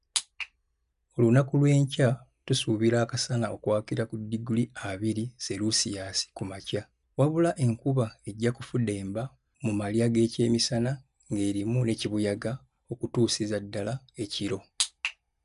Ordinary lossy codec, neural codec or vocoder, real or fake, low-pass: none; none; real; 10.8 kHz